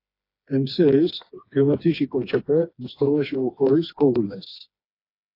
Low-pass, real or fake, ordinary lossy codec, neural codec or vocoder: 5.4 kHz; fake; AAC, 32 kbps; codec, 16 kHz, 2 kbps, FreqCodec, smaller model